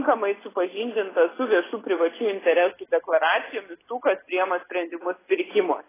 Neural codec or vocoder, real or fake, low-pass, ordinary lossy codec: none; real; 3.6 kHz; AAC, 16 kbps